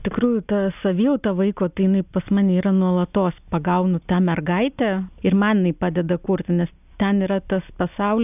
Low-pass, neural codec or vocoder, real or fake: 3.6 kHz; none; real